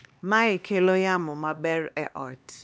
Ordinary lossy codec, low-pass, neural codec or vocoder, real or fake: none; none; codec, 16 kHz, 2 kbps, X-Codec, HuBERT features, trained on LibriSpeech; fake